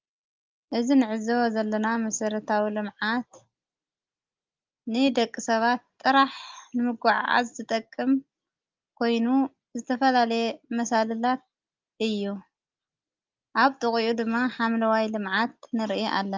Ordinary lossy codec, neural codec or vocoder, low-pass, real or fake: Opus, 24 kbps; none; 7.2 kHz; real